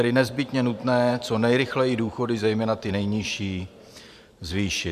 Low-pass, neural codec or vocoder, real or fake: 14.4 kHz; vocoder, 44.1 kHz, 128 mel bands every 512 samples, BigVGAN v2; fake